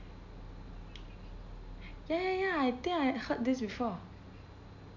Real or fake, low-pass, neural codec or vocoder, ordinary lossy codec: real; 7.2 kHz; none; none